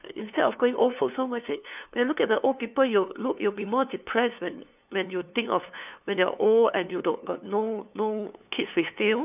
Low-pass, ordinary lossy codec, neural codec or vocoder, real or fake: 3.6 kHz; none; codec, 16 kHz, 2 kbps, FunCodec, trained on LibriTTS, 25 frames a second; fake